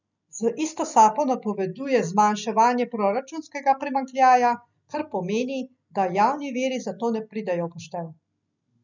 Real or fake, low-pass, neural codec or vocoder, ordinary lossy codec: real; 7.2 kHz; none; none